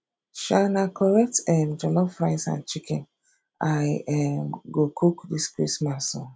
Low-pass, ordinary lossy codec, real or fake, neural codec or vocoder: none; none; real; none